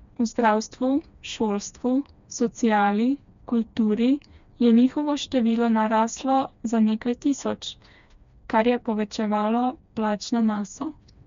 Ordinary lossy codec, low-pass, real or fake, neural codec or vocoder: MP3, 64 kbps; 7.2 kHz; fake; codec, 16 kHz, 2 kbps, FreqCodec, smaller model